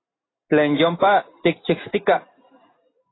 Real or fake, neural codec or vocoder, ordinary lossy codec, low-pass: real; none; AAC, 16 kbps; 7.2 kHz